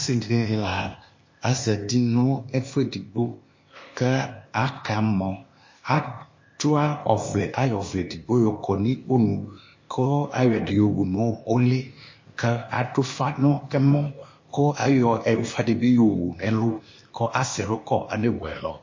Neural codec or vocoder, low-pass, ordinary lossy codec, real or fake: codec, 16 kHz, 0.8 kbps, ZipCodec; 7.2 kHz; MP3, 32 kbps; fake